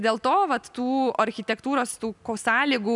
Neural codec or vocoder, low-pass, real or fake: none; 10.8 kHz; real